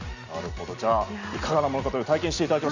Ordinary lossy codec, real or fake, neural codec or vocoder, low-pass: none; real; none; 7.2 kHz